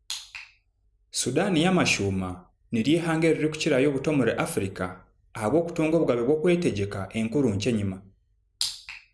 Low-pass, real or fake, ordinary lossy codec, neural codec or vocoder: none; real; none; none